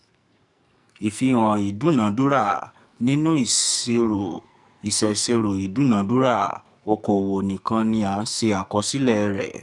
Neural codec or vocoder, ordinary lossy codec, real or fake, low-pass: codec, 44.1 kHz, 2.6 kbps, SNAC; none; fake; 10.8 kHz